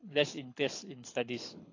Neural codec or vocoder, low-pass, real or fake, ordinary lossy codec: codec, 24 kHz, 6 kbps, HILCodec; 7.2 kHz; fake; AAC, 32 kbps